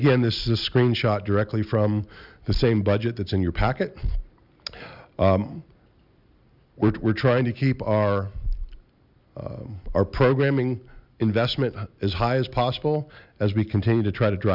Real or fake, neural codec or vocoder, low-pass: real; none; 5.4 kHz